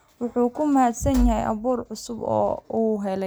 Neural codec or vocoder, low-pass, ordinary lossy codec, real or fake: none; none; none; real